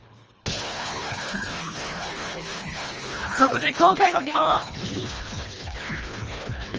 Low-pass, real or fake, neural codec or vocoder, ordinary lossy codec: 7.2 kHz; fake; codec, 24 kHz, 1.5 kbps, HILCodec; Opus, 24 kbps